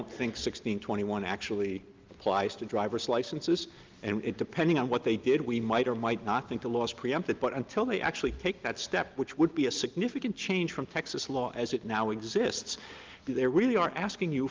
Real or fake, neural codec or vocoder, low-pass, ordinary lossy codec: real; none; 7.2 kHz; Opus, 32 kbps